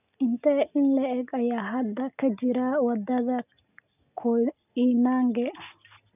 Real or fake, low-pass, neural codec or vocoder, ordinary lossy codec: real; 3.6 kHz; none; none